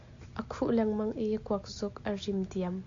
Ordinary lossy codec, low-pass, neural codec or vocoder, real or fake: AAC, 48 kbps; 7.2 kHz; none; real